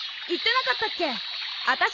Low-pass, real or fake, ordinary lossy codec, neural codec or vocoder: 7.2 kHz; real; none; none